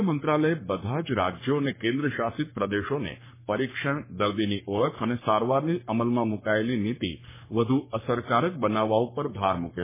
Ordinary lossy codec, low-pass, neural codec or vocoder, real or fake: MP3, 16 kbps; 3.6 kHz; codec, 44.1 kHz, 3.4 kbps, Pupu-Codec; fake